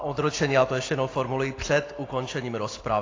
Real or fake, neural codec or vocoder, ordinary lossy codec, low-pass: real; none; AAC, 32 kbps; 7.2 kHz